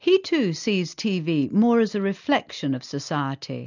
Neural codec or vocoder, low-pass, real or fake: none; 7.2 kHz; real